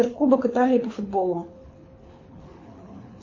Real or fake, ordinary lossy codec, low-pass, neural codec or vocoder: fake; MP3, 32 kbps; 7.2 kHz; codec, 24 kHz, 6 kbps, HILCodec